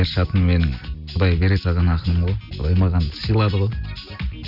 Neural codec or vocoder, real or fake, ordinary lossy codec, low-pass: none; real; Opus, 64 kbps; 5.4 kHz